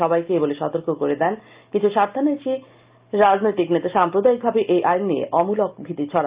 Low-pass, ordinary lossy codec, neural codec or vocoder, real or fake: 3.6 kHz; Opus, 24 kbps; none; real